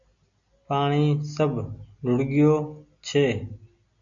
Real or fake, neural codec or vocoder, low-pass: real; none; 7.2 kHz